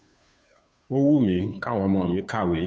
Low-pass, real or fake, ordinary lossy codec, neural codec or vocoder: none; fake; none; codec, 16 kHz, 2 kbps, FunCodec, trained on Chinese and English, 25 frames a second